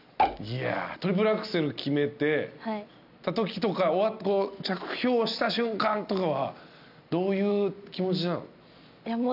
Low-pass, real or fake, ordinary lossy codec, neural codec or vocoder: 5.4 kHz; real; none; none